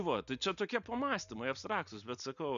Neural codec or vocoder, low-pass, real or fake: none; 7.2 kHz; real